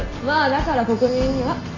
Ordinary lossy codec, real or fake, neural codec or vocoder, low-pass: AAC, 32 kbps; real; none; 7.2 kHz